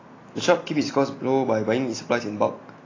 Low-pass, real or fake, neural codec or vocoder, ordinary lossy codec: 7.2 kHz; real; none; AAC, 32 kbps